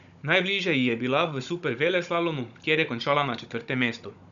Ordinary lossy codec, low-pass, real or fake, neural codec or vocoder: none; 7.2 kHz; fake; codec, 16 kHz, 16 kbps, FunCodec, trained on Chinese and English, 50 frames a second